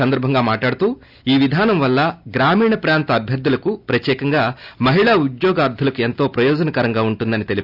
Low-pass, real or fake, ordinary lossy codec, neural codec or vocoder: 5.4 kHz; real; AAC, 48 kbps; none